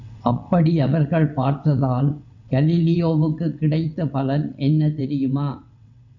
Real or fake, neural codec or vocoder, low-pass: fake; vocoder, 22.05 kHz, 80 mel bands, WaveNeXt; 7.2 kHz